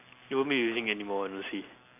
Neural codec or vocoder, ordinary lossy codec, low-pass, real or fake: none; none; 3.6 kHz; real